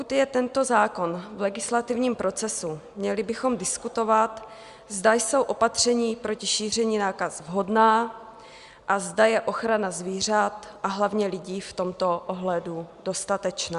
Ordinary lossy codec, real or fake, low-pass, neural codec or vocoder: Opus, 64 kbps; real; 10.8 kHz; none